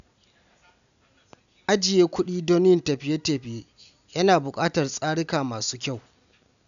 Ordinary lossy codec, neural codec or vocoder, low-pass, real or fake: none; none; 7.2 kHz; real